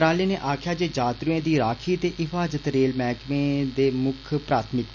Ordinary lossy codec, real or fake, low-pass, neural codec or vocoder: none; real; 7.2 kHz; none